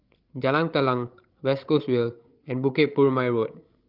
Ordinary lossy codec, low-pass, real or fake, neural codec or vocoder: Opus, 32 kbps; 5.4 kHz; fake; codec, 16 kHz, 16 kbps, FreqCodec, larger model